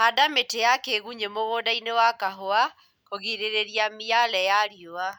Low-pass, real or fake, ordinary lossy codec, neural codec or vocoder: none; real; none; none